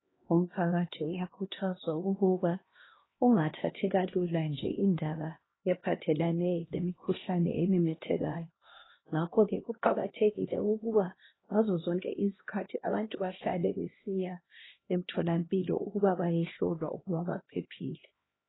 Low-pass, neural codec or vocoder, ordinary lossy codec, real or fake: 7.2 kHz; codec, 16 kHz, 1 kbps, X-Codec, HuBERT features, trained on LibriSpeech; AAC, 16 kbps; fake